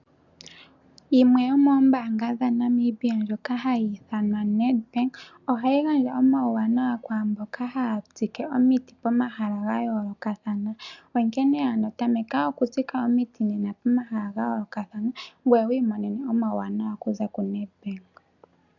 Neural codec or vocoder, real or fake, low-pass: none; real; 7.2 kHz